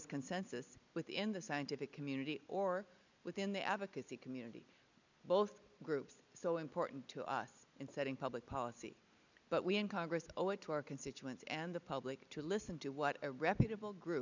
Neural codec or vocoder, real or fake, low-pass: none; real; 7.2 kHz